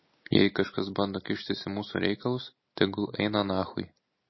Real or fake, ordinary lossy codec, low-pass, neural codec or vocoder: real; MP3, 24 kbps; 7.2 kHz; none